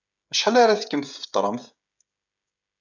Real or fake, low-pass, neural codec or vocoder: fake; 7.2 kHz; codec, 16 kHz, 16 kbps, FreqCodec, smaller model